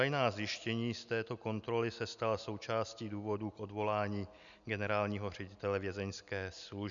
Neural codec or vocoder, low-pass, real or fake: none; 7.2 kHz; real